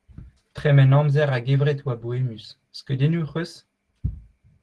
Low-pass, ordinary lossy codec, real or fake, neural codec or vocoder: 10.8 kHz; Opus, 16 kbps; real; none